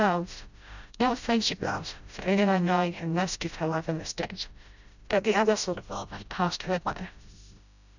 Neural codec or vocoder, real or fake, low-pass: codec, 16 kHz, 0.5 kbps, FreqCodec, smaller model; fake; 7.2 kHz